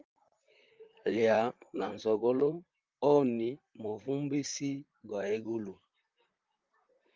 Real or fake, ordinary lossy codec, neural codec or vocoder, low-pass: fake; Opus, 24 kbps; vocoder, 44.1 kHz, 128 mel bands, Pupu-Vocoder; 7.2 kHz